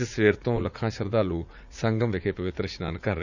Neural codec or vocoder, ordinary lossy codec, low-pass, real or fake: vocoder, 44.1 kHz, 80 mel bands, Vocos; none; 7.2 kHz; fake